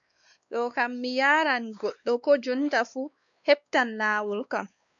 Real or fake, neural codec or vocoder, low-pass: fake; codec, 16 kHz, 2 kbps, X-Codec, WavLM features, trained on Multilingual LibriSpeech; 7.2 kHz